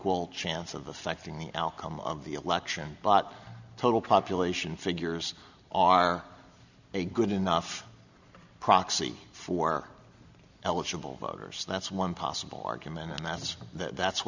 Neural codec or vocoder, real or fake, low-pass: none; real; 7.2 kHz